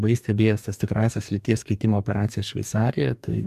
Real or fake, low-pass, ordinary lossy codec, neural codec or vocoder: fake; 14.4 kHz; MP3, 96 kbps; codec, 44.1 kHz, 2.6 kbps, DAC